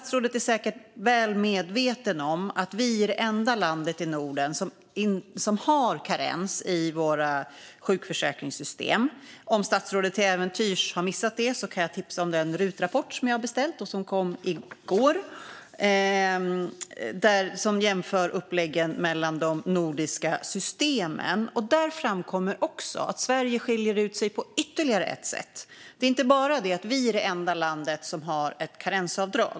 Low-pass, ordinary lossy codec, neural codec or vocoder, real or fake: none; none; none; real